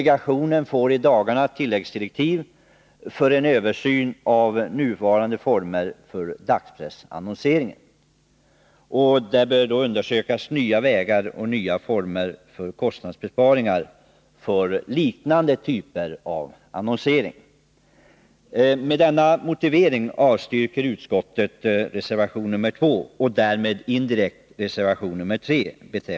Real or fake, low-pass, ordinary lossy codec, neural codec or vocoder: real; none; none; none